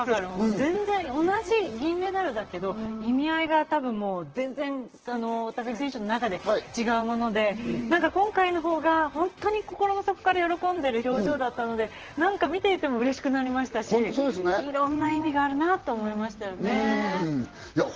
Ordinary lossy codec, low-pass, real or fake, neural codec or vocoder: Opus, 16 kbps; 7.2 kHz; fake; vocoder, 44.1 kHz, 128 mel bands, Pupu-Vocoder